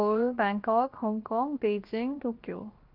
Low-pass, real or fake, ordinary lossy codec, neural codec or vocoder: 5.4 kHz; fake; Opus, 32 kbps; codec, 16 kHz, 0.7 kbps, FocalCodec